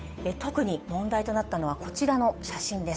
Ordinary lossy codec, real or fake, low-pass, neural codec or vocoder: none; fake; none; codec, 16 kHz, 8 kbps, FunCodec, trained on Chinese and English, 25 frames a second